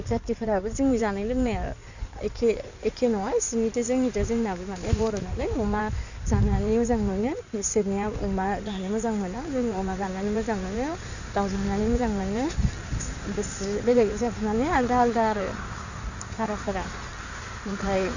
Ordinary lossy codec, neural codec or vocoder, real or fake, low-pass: none; codec, 16 kHz in and 24 kHz out, 2.2 kbps, FireRedTTS-2 codec; fake; 7.2 kHz